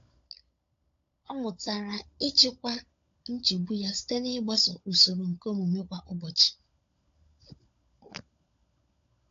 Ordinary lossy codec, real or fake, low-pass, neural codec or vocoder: AAC, 48 kbps; fake; 7.2 kHz; codec, 16 kHz, 16 kbps, FunCodec, trained on LibriTTS, 50 frames a second